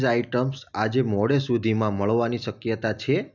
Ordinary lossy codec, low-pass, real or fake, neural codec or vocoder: none; 7.2 kHz; real; none